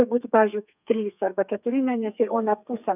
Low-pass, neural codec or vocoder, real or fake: 3.6 kHz; codec, 32 kHz, 1.9 kbps, SNAC; fake